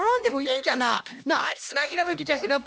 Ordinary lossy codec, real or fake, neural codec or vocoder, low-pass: none; fake; codec, 16 kHz, 1 kbps, X-Codec, HuBERT features, trained on LibriSpeech; none